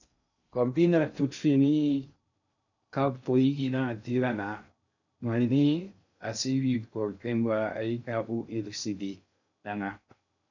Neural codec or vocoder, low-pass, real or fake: codec, 16 kHz in and 24 kHz out, 0.6 kbps, FocalCodec, streaming, 2048 codes; 7.2 kHz; fake